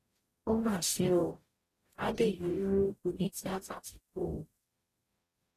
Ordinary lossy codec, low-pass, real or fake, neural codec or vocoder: AAC, 48 kbps; 14.4 kHz; fake; codec, 44.1 kHz, 0.9 kbps, DAC